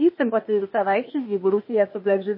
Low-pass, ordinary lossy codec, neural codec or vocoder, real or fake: 5.4 kHz; MP3, 24 kbps; codec, 16 kHz, 0.8 kbps, ZipCodec; fake